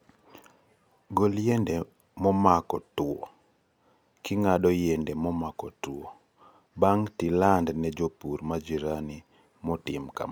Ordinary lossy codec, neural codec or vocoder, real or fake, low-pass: none; none; real; none